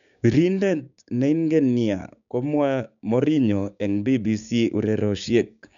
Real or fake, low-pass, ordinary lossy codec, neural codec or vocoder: fake; 7.2 kHz; none; codec, 16 kHz, 6 kbps, DAC